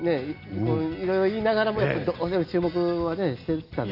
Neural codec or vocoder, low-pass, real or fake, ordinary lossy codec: none; 5.4 kHz; real; none